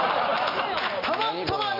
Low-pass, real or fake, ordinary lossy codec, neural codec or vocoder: 5.4 kHz; real; none; none